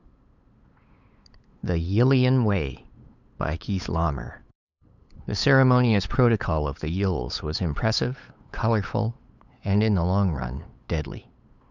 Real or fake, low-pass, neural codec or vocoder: fake; 7.2 kHz; codec, 16 kHz, 8 kbps, FunCodec, trained on LibriTTS, 25 frames a second